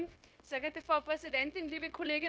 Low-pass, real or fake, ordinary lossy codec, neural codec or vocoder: none; fake; none; codec, 16 kHz, 0.9 kbps, LongCat-Audio-Codec